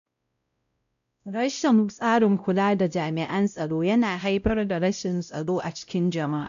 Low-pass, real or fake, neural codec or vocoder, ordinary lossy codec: 7.2 kHz; fake; codec, 16 kHz, 0.5 kbps, X-Codec, WavLM features, trained on Multilingual LibriSpeech; AAC, 96 kbps